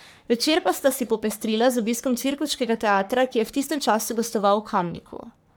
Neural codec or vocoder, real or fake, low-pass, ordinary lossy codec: codec, 44.1 kHz, 3.4 kbps, Pupu-Codec; fake; none; none